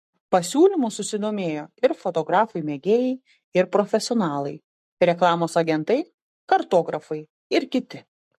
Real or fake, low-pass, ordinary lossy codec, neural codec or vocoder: fake; 14.4 kHz; MP3, 64 kbps; codec, 44.1 kHz, 7.8 kbps, Pupu-Codec